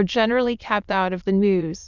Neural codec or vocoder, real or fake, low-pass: autoencoder, 22.05 kHz, a latent of 192 numbers a frame, VITS, trained on many speakers; fake; 7.2 kHz